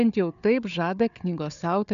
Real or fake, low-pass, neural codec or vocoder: fake; 7.2 kHz; codec, 16 kHz, 4 kbps, FunCodec, trained on Chinese and English, 50 frames a second